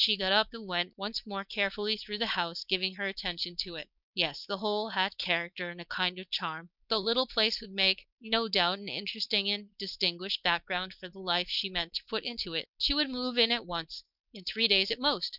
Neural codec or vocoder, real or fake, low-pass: codec, 16 kHz, 4.8 kbps, FACodec; fake; 5.4 kHz